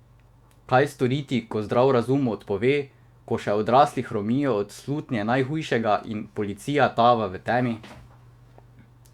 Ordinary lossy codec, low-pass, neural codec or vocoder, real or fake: Opus, 64 kbps; 19.8 kHz; autoencoder, 48 kHz, 128 numbers a frame, DAC-VAE, trained on Japanese speech; fake